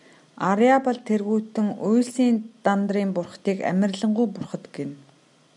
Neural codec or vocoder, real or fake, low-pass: none; real; 10.8 kHz